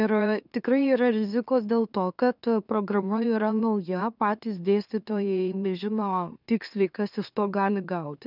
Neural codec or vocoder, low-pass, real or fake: autoencoder, 44.1 kHz, a latent of 192 numbers a frame, MeloTTS; 5.4 kHz; fake